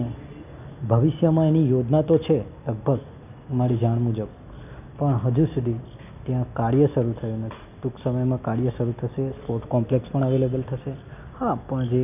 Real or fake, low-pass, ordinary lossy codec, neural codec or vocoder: real; 3.6 kHz; none; none